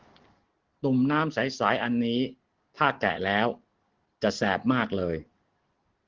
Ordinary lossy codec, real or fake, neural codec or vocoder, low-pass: Opus, 16 kbps; real; none; 7.2 kHz